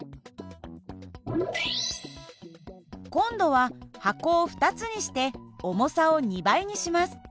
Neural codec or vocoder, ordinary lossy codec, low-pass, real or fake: none; none; none; real